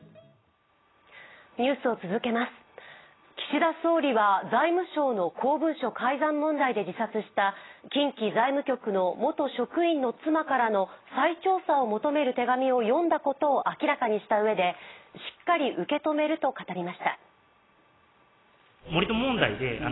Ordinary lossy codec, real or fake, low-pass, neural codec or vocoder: AAC, 16 kbps; real; 7.2 kHz; none